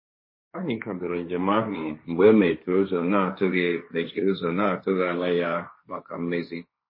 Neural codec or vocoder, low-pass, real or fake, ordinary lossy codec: codec, 16 kHz, 1.1 kbps, Voila-Tokenizer; 5.4 kHz; fake; MP3, 24 kbps